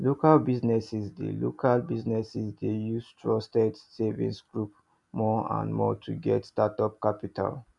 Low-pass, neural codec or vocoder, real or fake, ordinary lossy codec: 10.8 kHz; none; real; none